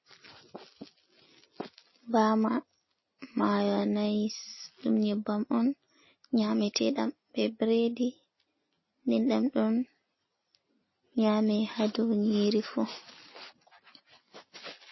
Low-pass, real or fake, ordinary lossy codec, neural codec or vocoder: 7.2 kHz; real; MP3, 24 kbps; none